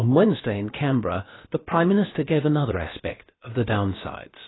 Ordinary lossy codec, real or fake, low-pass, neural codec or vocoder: AAC, 16 kbps; fake; 7.2 kHz; codec, 16 kHz, about 1 kbps, DyCAST, with the encoder's durations